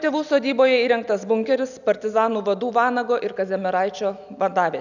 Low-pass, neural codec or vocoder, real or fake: 7.2 kHz; none; real